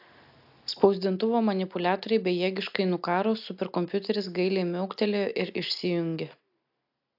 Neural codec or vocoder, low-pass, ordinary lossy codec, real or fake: none; 5.4 kHz; AAC, 48 kbps; real